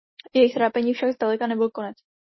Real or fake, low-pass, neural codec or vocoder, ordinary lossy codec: real; 7.2 kHz; none; MP3, 24 kbps